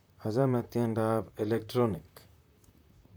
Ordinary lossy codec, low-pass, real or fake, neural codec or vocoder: none; none; fake; vocoder, 44.1 kHz, 128 mel bands, Pupu-Vocoder